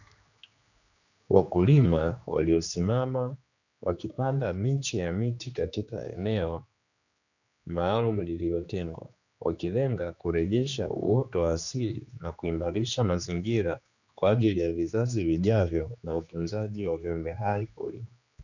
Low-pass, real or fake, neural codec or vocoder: 7.2 kHz; fake; codec, 16 kHz, 2 kbps, X-Codec, HuBERT features, trained on general audio